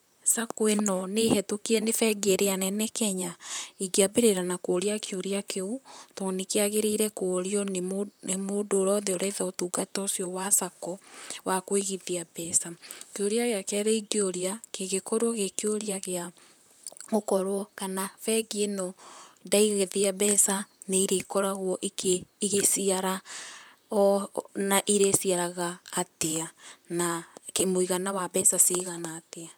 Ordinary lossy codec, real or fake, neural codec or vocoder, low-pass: none; fake; vocoder, 44.1 kHz, 128 mel bands, Pupu-Vocoder; none